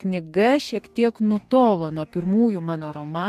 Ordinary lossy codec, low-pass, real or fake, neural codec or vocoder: MP3, 96 kbps; 14.4 kHz; fake; codec, 44.1 kHz, 2.6 kbps, DAC